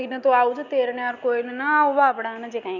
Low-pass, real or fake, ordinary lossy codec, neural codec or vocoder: 7.2 kHz; real; none; none